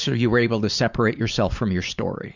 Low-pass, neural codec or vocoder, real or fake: 7.2 kHz; vocoder, 44.1 kHz, 128 mel bands every 512 samples, BigVGAN v2; fake